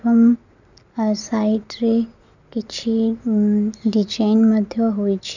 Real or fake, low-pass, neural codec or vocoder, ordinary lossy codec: real; 7.2 kHz; none; none